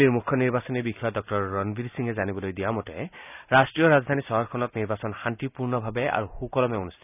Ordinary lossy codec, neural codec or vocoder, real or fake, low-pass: none; none; real; 3.6 kHz